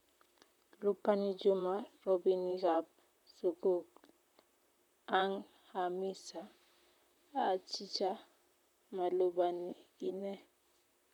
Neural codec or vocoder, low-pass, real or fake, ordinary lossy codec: vocoder, 44.1 kHz, 128 mel bands, Pupu-Vocoder; 19.8 kHz; fake; none